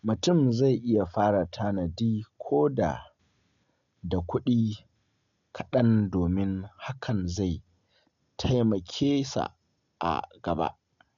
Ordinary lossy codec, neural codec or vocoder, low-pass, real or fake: none; none; 7.2 kHz; real